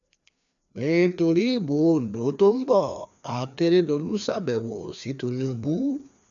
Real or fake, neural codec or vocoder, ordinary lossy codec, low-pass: fake; codec, 16 kHz, 2 kbps, FreqCodec, larger model; none; 7.2 kHz